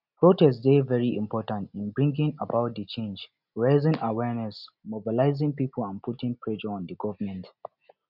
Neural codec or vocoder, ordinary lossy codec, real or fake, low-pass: none; none; real; 5.4 kHz